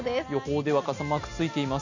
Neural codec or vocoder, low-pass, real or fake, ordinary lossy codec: none; 7.2 kHz; real; Opus, 64 kbps